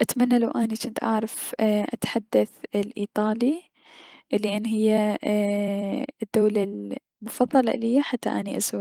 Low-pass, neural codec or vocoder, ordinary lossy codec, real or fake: 19.8 kHz; vocoder, 44.1 kHz, 128 mel bands every 256 samples, BigVGAN v2; Opus, 24 kbps; fake